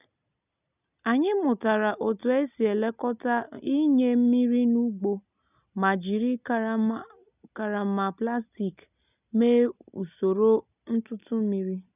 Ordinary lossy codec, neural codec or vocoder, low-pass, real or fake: none; none; 3.6 kHz; real